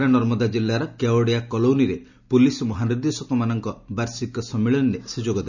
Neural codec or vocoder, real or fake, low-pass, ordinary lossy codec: none; real; 7.2 kHz; none